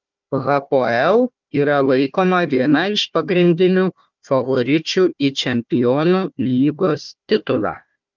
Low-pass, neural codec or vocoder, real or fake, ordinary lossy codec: 7.2 kHz; codec, 16 kHz, 1 kbps, FunCodec, trained on Chinese and English, 50 frames a second; fake; Opus, 24 kbps